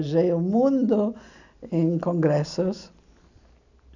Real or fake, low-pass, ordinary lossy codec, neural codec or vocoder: real; 7.2 kHz; none; none